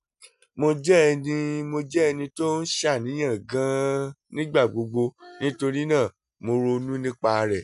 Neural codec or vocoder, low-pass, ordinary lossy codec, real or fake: none; 10.8 kHz; none; real